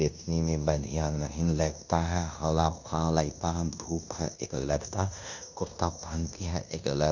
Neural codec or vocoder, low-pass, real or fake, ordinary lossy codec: codec, 16 kHz in and 24 kHz out, 0.9 kbps, LongCat-Audio-Codec, fine tuned four codebook decoder; 7.2 kHz; fake; none